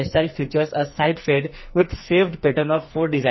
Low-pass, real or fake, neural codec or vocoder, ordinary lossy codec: 7.2 kHz; fake; codec, 44.1 kHz, 2.6 kbps, SNAC; MP3, 24 kbps